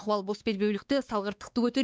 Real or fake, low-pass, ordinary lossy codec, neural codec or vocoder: fake; none; none; codec, 16 kHz, 2 kbps, X-Codec, WavLM features, trained on Multilingual LibriSpeech